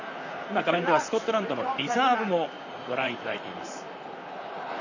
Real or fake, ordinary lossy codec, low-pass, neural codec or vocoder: fake; none; 7.2 kHz; vocoder, 44.1 kHz, 128 mel bands, Pupu-Vocoder